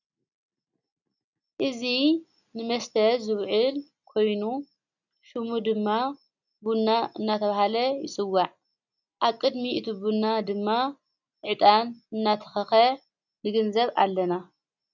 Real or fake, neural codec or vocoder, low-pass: real; none; 7.2 kHz